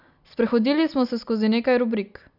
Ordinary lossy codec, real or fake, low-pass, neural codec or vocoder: none; real; 5.4 kHz; none